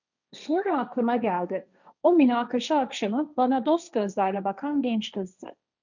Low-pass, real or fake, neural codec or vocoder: 7.2 kHz; fake; codec, 16 kHz, 1.1 kbps, Voila-Tokenizer